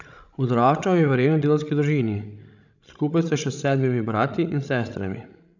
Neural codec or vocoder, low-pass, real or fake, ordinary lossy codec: codec, 16 kHz, 16 kbps, FreqCodec, larger model; 7.2 kHz; fake; none